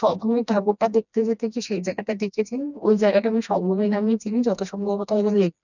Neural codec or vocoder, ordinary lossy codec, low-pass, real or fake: codec, 16 kHz, 1 kbps, FreqCodec, smaller model; none; 7.2 kHz; fake